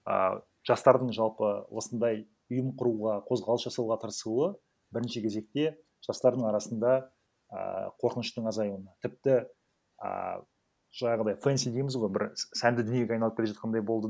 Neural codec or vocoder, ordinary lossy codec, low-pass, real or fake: none; none; none; real